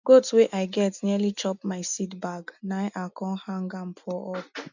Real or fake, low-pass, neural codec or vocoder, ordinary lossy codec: real; 7.2 kHz; none; none